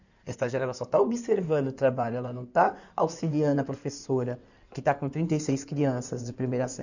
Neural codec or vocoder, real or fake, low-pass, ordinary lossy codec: codec, 16 kHz in and 24 kHz out, 2.2 kbps, FireRedTTS-2 codec; fake; 7.2 kHz; none